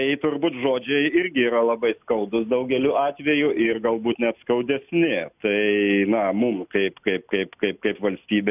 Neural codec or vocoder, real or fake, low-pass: none; real; 3.6 kHz